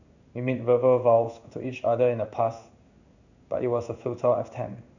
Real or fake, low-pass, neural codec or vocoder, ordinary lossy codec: fake; 7.2 kHz; codec, 16 kHz in and 24 kHz out, 1 kbps, XY-Tokenizer; none